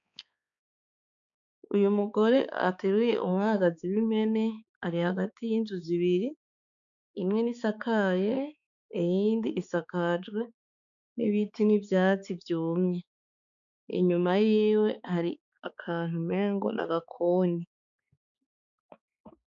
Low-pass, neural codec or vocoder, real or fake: 7.2 kHz; codec, 16 kHz, 4 kbps, X-Codec, HuBERT features, trained on balanced general audio; fake